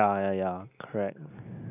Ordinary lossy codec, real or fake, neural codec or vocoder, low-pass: none; real; none; 3.6 kHz